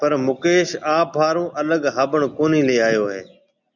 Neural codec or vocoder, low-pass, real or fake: none; 7.2 kHz; real